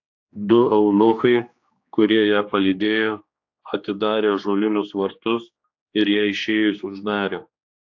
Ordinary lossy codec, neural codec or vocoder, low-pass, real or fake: AAC, 48 kbps; codec, 16 kHz, 2 kbps, X-Codec, HuBERT features, trained on general audio; 7.2 kHz; fake